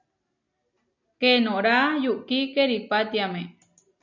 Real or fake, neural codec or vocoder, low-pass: real; none; 7.2 kHz